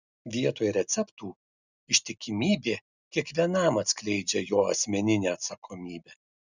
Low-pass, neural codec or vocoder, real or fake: 7.2 kHz; none; real